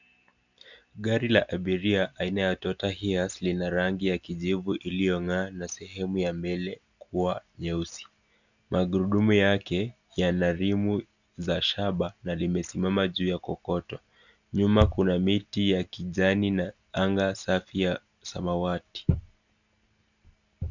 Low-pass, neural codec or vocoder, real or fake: 7.2 kHz; none; real